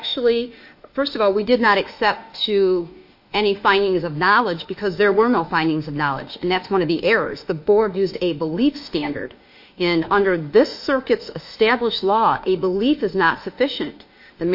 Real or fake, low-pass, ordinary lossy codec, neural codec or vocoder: fake; 5.4 kHz; MP3, 32 kbps; autoencoder, 48 kHz, 32 numbers a frame, DAC-VAE, trained on Japanese speech